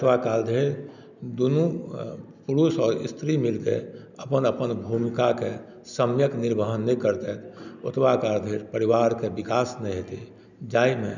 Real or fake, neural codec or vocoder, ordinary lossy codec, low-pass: real; none; none; 7.2 kHz